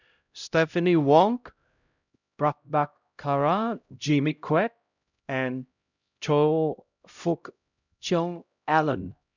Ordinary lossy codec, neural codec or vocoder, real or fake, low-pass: none; codec, 16 kHz, 0.5 kbps, X-Codec, HuBERT features, trained on LibriSpeech; fake; 7.2 kHz